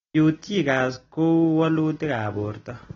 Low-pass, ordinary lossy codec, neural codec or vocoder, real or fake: 7.2 kHz; AAC, 24 kbps; none; real